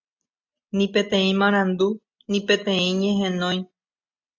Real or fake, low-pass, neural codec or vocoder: real; 7.2 kHz; none